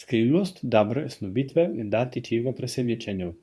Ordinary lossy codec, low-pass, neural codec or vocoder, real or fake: none; none; codec, 24 kHz, 0.9 kbps, WavTokenizer, medium speech release version 2; fake